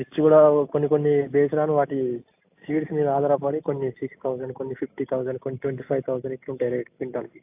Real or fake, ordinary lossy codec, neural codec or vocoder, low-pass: real; AAC, 32 kbps; none; 3.6 kHz